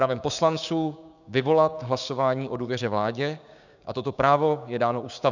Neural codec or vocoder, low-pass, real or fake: codec, 16 kHz, 6 kbps, DAC; 7.2 kHz; fake